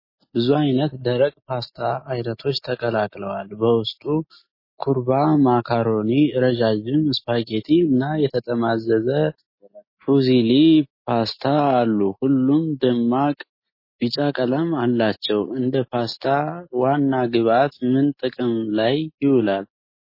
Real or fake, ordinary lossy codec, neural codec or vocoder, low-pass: real; MP3, 24 kbps; none; 5.4 kHz